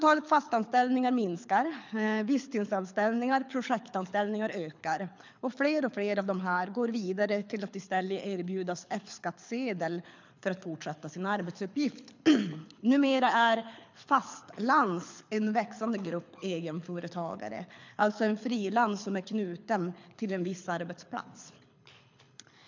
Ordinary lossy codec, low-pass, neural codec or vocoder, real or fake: MP3, 64 kbps; 7.2 kHz; codec, 24 kHz, 6 kbps, HILCodec; fake